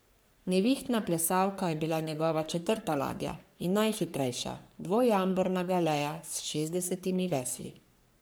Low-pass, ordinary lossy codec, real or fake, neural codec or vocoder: none; none; fake; codec, 44.1 kHz, 3.4 kbps, Pupu-Codec